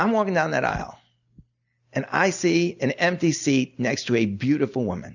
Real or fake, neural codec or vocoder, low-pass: real; none; 7.2 kHz